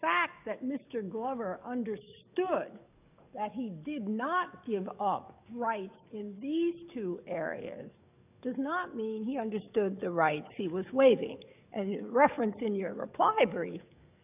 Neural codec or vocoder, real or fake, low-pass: codec, 44.1 kHz, 7.8 kbps, DAC; fake; 3.6 kHz